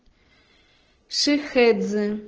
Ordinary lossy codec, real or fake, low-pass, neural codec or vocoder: Opus, 16 kbps; real; 7.2 kHz; none